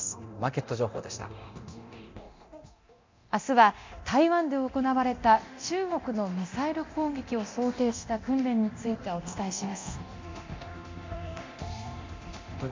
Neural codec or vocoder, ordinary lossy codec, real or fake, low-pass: codec, 24 kHz, 0.9 kbps, DualCodec; MP3, 64 kbps; fake; 7.2 kHz